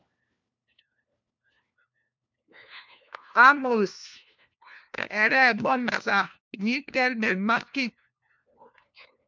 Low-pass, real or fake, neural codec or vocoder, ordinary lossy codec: 7.2 kHz; fake; codec, 16 kHz, 1 kbps, FunCodec, trained on LibriTTS, 50 frames a second; MP3, 64 kbps